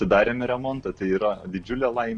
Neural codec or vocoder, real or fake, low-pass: none; real; 10.8 kHz